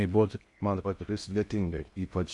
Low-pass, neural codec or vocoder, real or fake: 10.8 kHz; codec, 16 kHz in and 24 kHz out, 0.6 kbps, FocalCodec, streaming, 2048 codes; fake